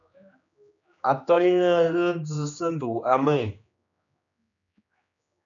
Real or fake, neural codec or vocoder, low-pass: fake; codec, 16 kHz, 2 kbps, X-Codec, HuBERT features, trained on general audio; 7.2 kHz